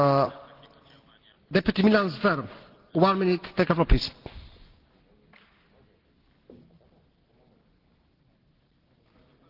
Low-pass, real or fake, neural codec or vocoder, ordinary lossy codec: 5.4 kHz; real; none; Opus, 16 kbps